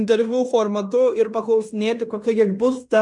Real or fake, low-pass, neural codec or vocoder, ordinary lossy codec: fake; 10.8 kHz; codec, 16 kHz in and 24 kHz out, 0.9 kbps, LongCat-Audio-Codec, fine tuned four codebook decoder; MP3, 96 kbps